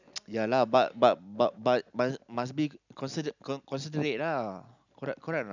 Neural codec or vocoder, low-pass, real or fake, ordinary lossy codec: none; 7.2 kHz; real; none